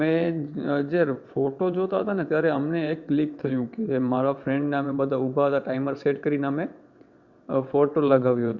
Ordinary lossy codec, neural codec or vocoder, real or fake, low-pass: Opus, 64 kbps; vocoder, 22.05 kHz, 80 mel bands, WaveNeXt; fake; 7.2 kHz